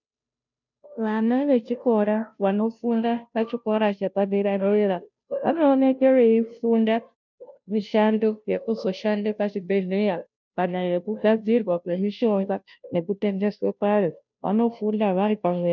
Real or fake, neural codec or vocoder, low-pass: fake; codec, 16 kHz, 0.5 kbps, FunCodec, trained on Chinese and English, 25 frames a second; 7.2 kHz